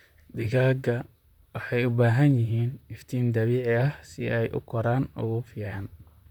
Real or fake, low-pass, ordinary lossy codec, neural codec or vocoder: fake; 19.8 kHz; none; vocoder, 44.1 kHz, 128 mel bands, Pupu-Vocoder